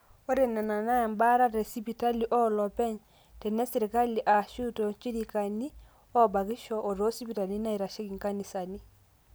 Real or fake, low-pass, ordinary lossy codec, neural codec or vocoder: real; none; none; none